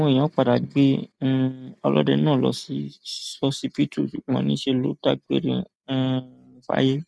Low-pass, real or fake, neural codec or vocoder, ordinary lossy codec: none; real; none; none